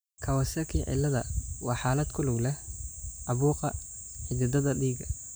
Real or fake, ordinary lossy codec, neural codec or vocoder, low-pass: real; none; none; none